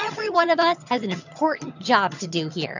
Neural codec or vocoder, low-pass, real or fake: vocoder, 22.05 kHz, 80 mel bands, HiFi-GAN; 7.2 kHz; fake